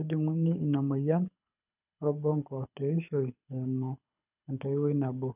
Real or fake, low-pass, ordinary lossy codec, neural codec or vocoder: fake; 3.6 kHz; none; codec, 24 kHz, 6 kbps, HILCodec